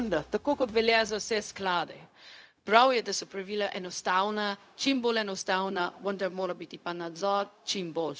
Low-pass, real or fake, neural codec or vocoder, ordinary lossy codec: none; fake; codec, 16 kHz, 0.4 kbps, LongCat-Audio-Codec; none